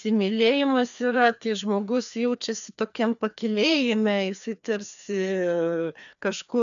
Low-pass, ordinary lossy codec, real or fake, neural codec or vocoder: 7.2 kHz; MP3, 96 kbps; fake; codec, 16 kHz, 2 kbps, FreqCodec, larger model